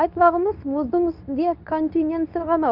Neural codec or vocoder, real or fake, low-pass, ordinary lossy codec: codec, 24 kHz, 0.9 kbps, WavTokenizer, medium speech release version 2; fake; 5.4 kHz; none